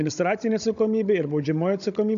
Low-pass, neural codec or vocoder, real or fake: 7.2 kHz; codec, 16 kHz, 16 kbps, FunCodec, trained on Chinese and English, 50 frames a second; fake